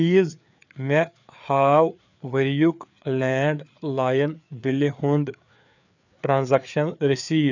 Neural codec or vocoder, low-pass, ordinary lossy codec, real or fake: codec, 16 kHz, 4 kbps, FreqCodec, larger model; 7.2 kHz; none; fake